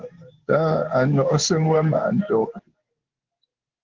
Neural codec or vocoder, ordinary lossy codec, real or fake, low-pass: codec, 16 kHz in and 24 kHz out, 1 kbps, XY-Tokenizer; Opus, 16 kbps; fake; 7.2 kHz